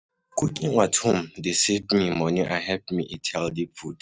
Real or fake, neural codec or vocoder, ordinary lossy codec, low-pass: real; none; none; none